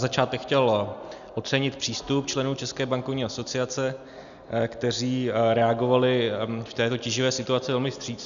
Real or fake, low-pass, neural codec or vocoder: real; 7.2 kHz; none